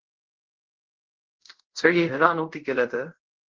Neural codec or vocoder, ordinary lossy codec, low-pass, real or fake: codec, 24 kHz, 0.5 kbps, DualCodec; Opus, 16 kbps; 7.2 kHz; fake